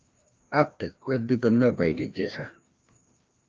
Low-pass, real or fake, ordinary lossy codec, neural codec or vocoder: 7.2 kHz; fake; Opus, 24 kbps; codec, 16 kHz, 1 kbps, FreqCodec, larger model